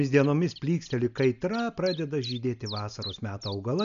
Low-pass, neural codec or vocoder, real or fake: 7.2 kHz; none; real